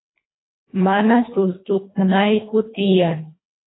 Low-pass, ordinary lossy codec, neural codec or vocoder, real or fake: 7.2 kHz; AAC, 16 kbps; codec, 24 kHz, 1.5 kbps, HILCodec; fake